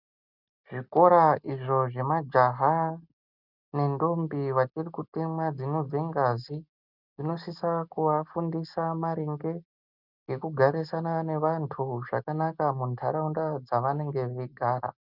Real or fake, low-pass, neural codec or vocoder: real; 5.4 kHz; none